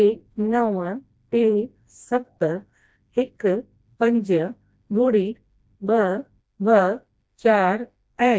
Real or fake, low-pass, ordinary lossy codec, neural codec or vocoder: fake; none; none; codec, 16 kHz, 1 kbps, FreqCodec, smaller model